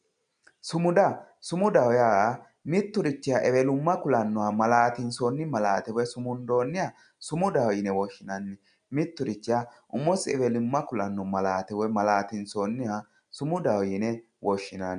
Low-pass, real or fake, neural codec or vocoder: 9.9 kHz; real; none